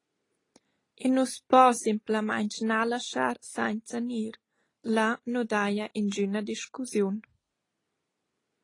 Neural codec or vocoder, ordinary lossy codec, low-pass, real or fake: none; AAC, 32 kbps; 10.8 kHz; real